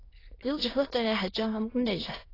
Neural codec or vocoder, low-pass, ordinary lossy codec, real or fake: autoencoder, 22.05 kHz, a latent of 192 numbers a frame, VITS, trained on many speakers; 5.4 kHz; AAC, 24 kbps; fake